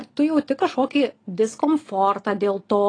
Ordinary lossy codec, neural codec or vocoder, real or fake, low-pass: AAC, 32 kbps; none; real; 9.9 kHz